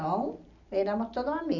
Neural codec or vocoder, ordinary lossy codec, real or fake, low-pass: none; none; real; 7.2 kHz